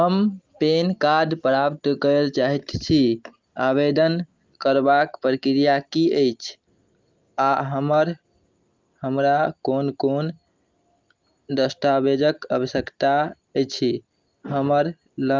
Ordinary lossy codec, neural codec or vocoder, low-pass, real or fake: Opus, 32 kbps; none; 7.2 kHz; real